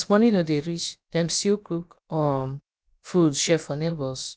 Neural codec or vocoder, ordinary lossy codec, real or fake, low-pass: codec, 16 kHz, about 1 kbps, DyCAST, with the encoder's durations; none; fake; none